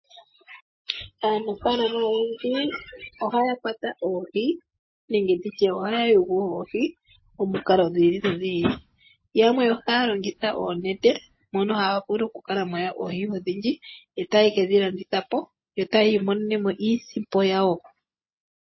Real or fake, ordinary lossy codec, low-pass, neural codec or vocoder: real; MP3, 24 kbps; 7.2 kHz; none